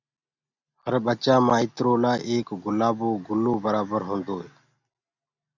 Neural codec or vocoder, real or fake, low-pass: none; real; 7.2 kHz